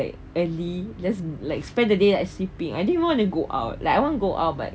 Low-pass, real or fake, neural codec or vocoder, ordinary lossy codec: none; real; none; none